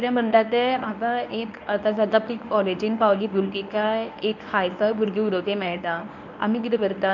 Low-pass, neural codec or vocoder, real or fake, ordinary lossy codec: 7.2 kHz; codec, 24 kHz, 0.9 kbps, WavTokenizer, medium speech release version 1; fake; none